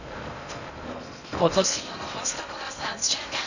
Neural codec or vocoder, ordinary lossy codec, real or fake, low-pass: codec, 16 kHz in and 24 kHz out, 0.6 kbps, FocalCodec, streaming, 2048 codes; none; fake; 7.2 kHz